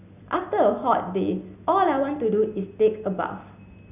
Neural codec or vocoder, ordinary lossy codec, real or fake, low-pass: none; none; real; 3.6 kHz